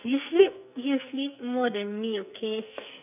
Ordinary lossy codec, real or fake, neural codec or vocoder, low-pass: none; fake; codec, 44.1 kHz, 2.6 kbps, SNAC; 3.6 kHz